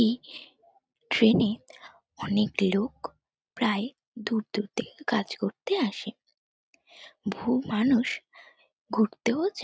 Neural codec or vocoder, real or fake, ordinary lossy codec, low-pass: none; real; none; none